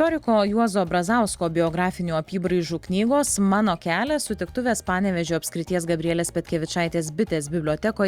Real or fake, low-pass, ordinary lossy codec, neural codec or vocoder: real; 19.8 kHz; Opus, 64 kbps; none